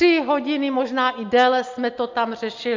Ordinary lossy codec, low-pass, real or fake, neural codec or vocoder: MP3, 64 kbps; 7.2 kHz; real; none